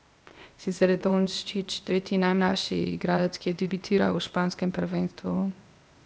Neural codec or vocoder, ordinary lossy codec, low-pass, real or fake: codec, 16 kHz, 0.8 kbps, ZipCodec; none; none; fake